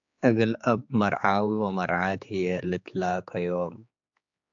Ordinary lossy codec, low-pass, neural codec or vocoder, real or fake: AAC, 64 kbps; 7.2 kHz; codec, 16 kHz, 4 kbps, X-Codec, HuBERT features, trained on general audio; fake